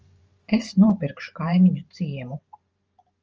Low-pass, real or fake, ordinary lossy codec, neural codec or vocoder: 7.2 kHz; fake; Opus, 24 kbps; vocoder, 44.1 kHz, 128 mel bands every 512 samples, BigVGAN v2